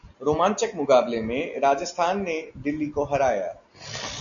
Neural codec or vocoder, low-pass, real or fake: none; 7.2 kHz; real